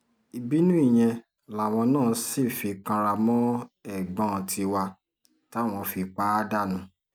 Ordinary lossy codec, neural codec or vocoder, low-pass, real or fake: none; none; none; real